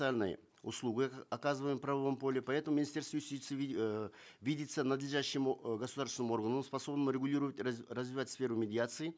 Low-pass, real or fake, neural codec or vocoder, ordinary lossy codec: none; real; none; none